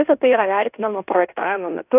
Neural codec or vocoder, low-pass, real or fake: codec, 16 kHz in and 24 kHz out, 0.9 kbps, LongCat-Audio-Codec, fine tuned four codebook decoder; 3.6 kHz; fake